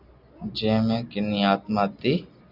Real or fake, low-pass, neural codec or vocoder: real; 5.4 kHz; none